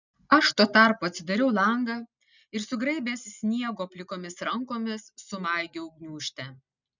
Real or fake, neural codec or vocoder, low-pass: real; none; 7.2 kHz